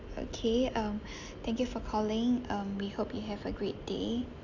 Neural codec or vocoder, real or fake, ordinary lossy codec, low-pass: none; real; none; 7.2 kHz